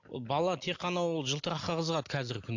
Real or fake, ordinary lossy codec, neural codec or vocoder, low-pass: real; AAC, 48 kbps; none; 7.2 kHz